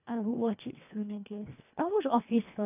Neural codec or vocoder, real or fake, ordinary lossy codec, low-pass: codec, 24 kHz, 1.5 kbps, HILCodec; fake; none; 3.6 kHz